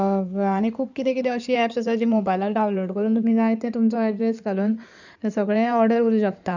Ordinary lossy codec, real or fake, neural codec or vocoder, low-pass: none; fake; codec, 16 kHz in and 24 kHz out, 2.2 kbps, FireRedTTS-2 codec; 7.2 kHz